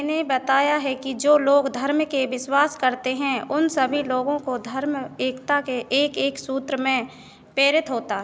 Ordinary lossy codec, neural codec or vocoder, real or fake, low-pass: none; none; real; none